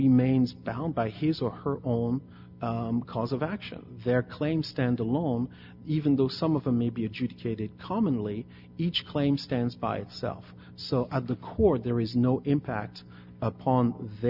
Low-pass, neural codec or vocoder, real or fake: 5.4 kHz; none; real